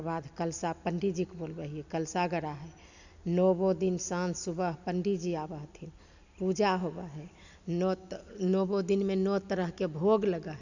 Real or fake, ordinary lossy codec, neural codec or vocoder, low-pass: real; none; none; 7.2 kHz